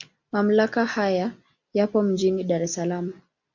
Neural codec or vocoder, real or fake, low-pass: none; real; 7.2 kHz